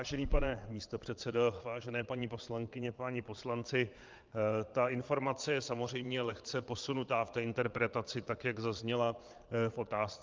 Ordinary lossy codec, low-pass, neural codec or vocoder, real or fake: Opus, 32 kbps; 7.2 kHz; vocoder, 22.05 kHz, 80 mel bands, Vocos; fake